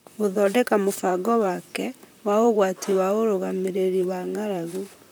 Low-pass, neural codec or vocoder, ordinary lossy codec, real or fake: none; vocoder, 44.1 kHz, 128 mel bands, Pupu-Vocoder; none; fake